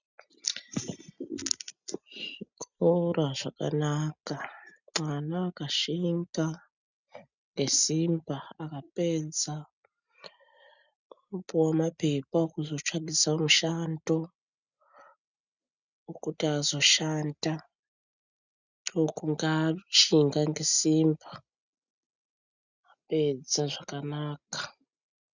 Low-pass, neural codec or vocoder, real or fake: 7.2 kHz; none; real